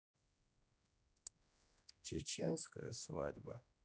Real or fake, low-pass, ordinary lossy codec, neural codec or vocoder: fake; none; none; codec, 16 kHz, 1 kbps, X-Codec, HuBERT features, trained on general audio